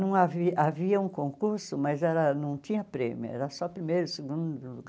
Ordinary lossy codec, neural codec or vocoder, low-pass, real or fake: none; none; none; real